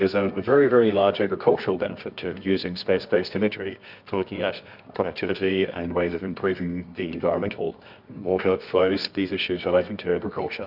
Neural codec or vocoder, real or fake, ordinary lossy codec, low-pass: codec, 24 kHz, 0.9 kbps, WavTokenizer, medium music audio release; fake; AAC, 48 kbps; 5.4 kHz